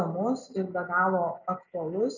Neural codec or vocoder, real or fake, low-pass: none; real; 7.2 kHz